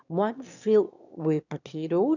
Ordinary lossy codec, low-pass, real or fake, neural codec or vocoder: none; 7.2 kHz; fake; autoencoder, 22.05 kHz, a latent of 192 numbers a frame, VITS, trained on one speaker